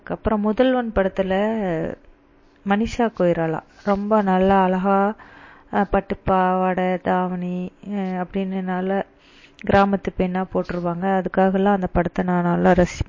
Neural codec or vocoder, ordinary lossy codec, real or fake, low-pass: none; MP3, 32 kbps; real; 7.2 kHz